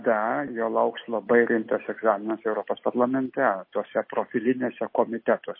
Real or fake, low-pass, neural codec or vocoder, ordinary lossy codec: real; 5.4 kHz; none; MP3, 24 kbps